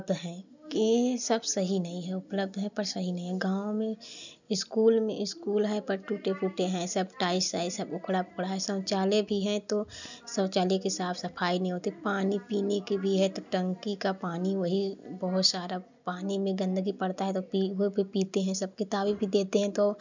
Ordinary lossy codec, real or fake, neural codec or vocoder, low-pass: none; real; none; 7.2 kHz